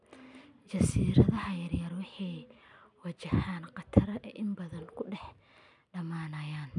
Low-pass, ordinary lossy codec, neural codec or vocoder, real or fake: 10.8 kHz; none; none; real